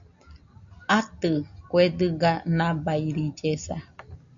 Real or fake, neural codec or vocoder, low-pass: real; none; 7.2 kHz